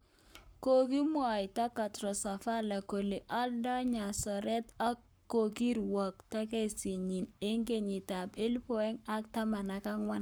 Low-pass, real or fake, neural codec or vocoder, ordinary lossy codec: none; fake; codec, 44.1 kHz, 7.8 kbps, Pupu-Codec; none